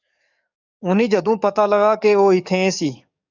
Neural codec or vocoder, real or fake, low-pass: codec, 44.1 kHz, 7.8 kbps, DAC; fake; 7.2 kHz